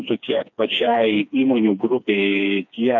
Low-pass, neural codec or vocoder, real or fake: 7.2 kHz; codec, 16 kHz, 2 kbps, FreqCodec, smaller model; fake